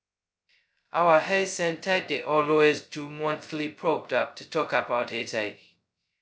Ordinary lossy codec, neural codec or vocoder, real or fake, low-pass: none; codec, 16 kHz, 0.2 kbps, FocalCodec; fake; none